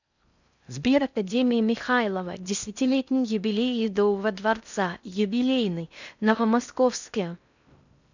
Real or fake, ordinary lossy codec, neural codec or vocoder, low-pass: fake; none; codec, 16 kHz in and 24 kHz out, 0.6 kbps, FocalCodec, streaming, 4096 codes; 7.2 kHz